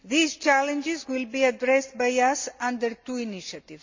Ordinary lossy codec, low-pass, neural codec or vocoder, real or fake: MP3, 48 kbps; 7.2 kHz; none; real